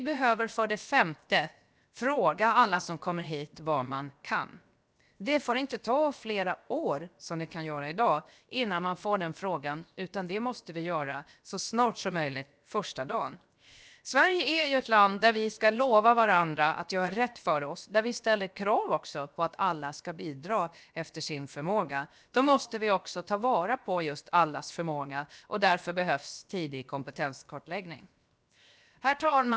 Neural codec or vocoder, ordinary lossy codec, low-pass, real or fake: codec, 16 kHz, 0.7 kbps, FocalCodec; none; none; fake